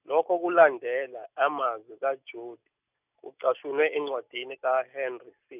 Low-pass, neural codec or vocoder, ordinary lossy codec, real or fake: 3.6 kHz; none; none; real